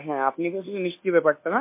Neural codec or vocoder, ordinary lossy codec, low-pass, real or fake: codec, 24 kHz, 1.2 kbps, DualCodec; MP3, 24 kbps; 3.6 kHz; fake